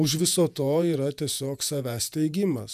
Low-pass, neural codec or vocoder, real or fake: 14.4 kHz; vocoder, 48 kHz, 128 mel bands, Vocos; fake